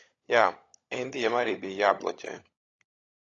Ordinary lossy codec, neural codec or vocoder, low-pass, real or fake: AAC, 32 kbps; codec, 16 kHz, 16 kbps, FunCodec, trained on LibriTTS, 50 frames a second; 7.2 kHz; fake